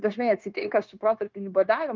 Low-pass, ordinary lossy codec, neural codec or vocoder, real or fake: 7.2 kHz; Opus, 32 kbps; codec, 16 kHz, 0.9 kbps, LongCat-Audio-Codec; fake